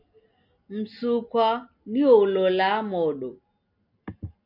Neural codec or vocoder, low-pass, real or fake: none; 5.4 kHz; real